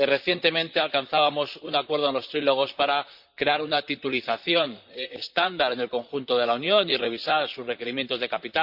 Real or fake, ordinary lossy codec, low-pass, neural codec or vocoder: fake; Opus, 64 kbps; 5.4 kHz; vocoder, 44.1 kHz, 128 mel bands, Pupu-Vocoder